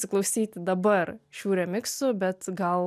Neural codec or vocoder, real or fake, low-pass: none; real; 14.4 kHz